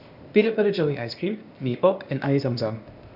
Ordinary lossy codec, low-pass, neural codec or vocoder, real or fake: none; 5.4 kHz; codec, 16 kHz, 0.8 kbps, ZipCodec; fake